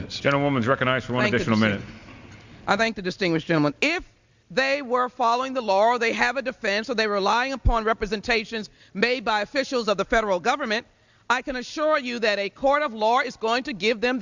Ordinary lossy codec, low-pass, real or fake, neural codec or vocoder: Opus, 64 kbps; 7.2 kHz; real; none